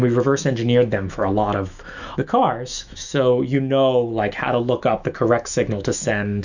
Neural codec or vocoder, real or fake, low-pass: autoencoder, 48 kHz, 128 numbers a frame, DAC-VAE, trained on Japanese speech; fake; 7.2 kHz